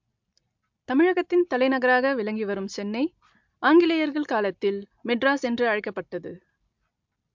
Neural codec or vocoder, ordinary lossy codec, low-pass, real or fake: none; MP3, 64 kbps; 7.2 kHz; real